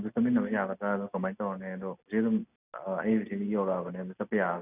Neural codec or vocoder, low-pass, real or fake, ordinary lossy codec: none; 3.6 kHz; real; none